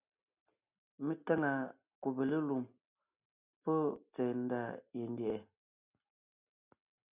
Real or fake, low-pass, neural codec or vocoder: real; 3.6 kHz; none